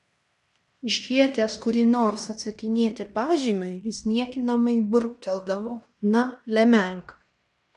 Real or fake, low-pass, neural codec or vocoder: fake; 10.8 kHz; codec, 16 kHz in and 24 kHz out, 0.9 kbps, LongCat-Audio-Codec, fine tuned four codebook decoder